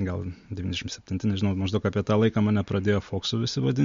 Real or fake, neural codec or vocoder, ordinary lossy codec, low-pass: real; none; MP3, 48 kbps; 7.2 kHz